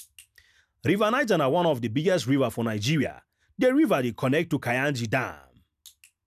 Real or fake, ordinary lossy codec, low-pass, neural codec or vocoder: real; none; 14.4 kHz; none